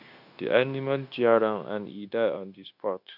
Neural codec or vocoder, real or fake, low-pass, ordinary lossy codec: codec, 16 kHz, 0.9 kbps, LongCat-Audio-Codec; fake; 5.4 kHz; none